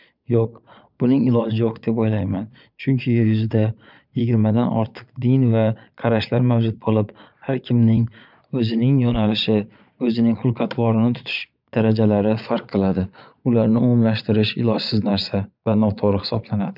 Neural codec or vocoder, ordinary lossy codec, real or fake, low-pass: vocoder, 22.05 kHz, 80 mel bands, Vocos; none; fake; 5.4 kHz